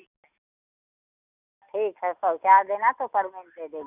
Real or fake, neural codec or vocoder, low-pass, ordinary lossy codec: real; none; 3.6 kHz; none